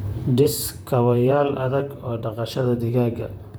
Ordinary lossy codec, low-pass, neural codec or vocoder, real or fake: none; none; vocoder, 44.1 kHz, 128 mel bands, Pupu-Vocoder; fake